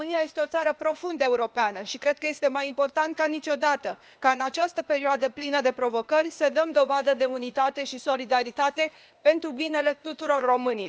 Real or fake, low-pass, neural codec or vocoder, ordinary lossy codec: fake; none; codec, 16 kHz, 0.8 kbps, ZipCodec; none